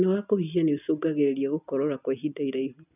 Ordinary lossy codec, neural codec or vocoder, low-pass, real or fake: none; none; 3.6 kHz; real